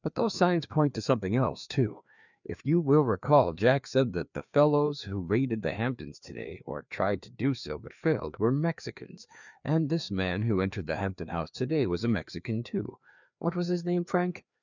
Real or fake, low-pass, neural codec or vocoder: fake; 7.2 kHz; codec, 16 kHz, 2 kbps, FreqCodec, larger model